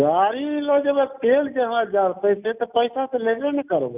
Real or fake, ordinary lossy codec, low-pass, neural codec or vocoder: real; Opus, 64 kbps; 3.6 kHz; none